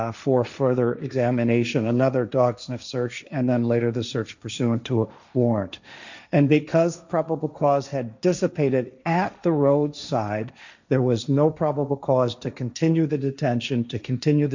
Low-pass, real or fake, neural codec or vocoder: 7.2 kHz; fake; codec, 16 kHz, 1.1 kbps, Voila-Tokenizer